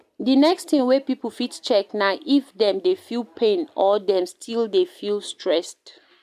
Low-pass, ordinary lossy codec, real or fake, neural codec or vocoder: 14.4 kHz; MP3, 96 kbps; real; none